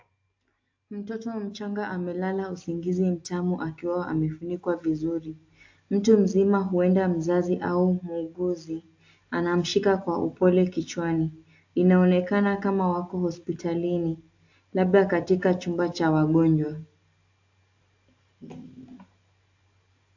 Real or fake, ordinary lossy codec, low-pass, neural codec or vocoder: real; AAC, 48 kbps; 7.2 kHz; none